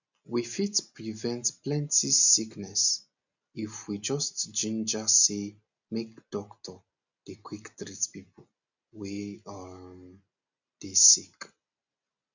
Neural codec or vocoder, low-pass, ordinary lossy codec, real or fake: none; 7.2 kHz; none; real